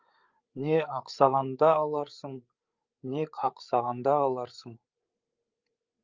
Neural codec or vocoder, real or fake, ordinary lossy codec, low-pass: codec, 16 kHz, 8 kbps, FreqCodec, larger model; fake; Opus, 24 kbps; 7.2 kHz